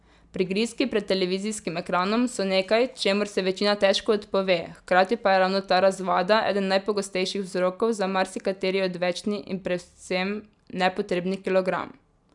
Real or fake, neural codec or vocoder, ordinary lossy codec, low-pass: real; none; none; 10.8 kHz